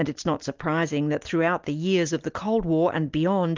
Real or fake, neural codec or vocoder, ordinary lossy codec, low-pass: real; none; Opus, 24 kbps; 7.2 kHz